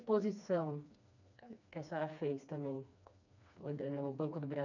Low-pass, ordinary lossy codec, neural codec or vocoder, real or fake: 7.2 kHz; none; codec, 16 kHz, 2 kbps, FreqCodec, smaller model; fake